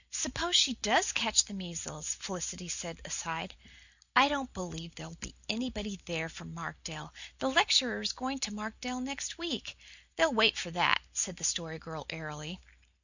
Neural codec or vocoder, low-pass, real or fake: none; 7.2 kHz; real